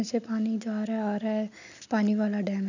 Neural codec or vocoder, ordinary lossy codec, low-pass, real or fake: none; none; 7.2 kHz; real